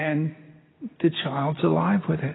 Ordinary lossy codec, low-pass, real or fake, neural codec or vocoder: AAC, 16 kbps; 7.2 kHz; real; none